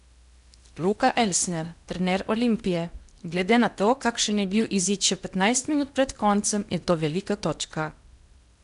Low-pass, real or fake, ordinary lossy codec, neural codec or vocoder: 10.8 kHz; fake; none; codec, 16 kHz in and 24 kHz out, 0.8 kbps, FocalCodec, streaming, 65536 codes